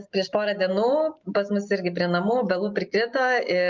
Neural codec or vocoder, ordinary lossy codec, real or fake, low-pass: none; Opus, 32 kbps; real; 7.2 kHz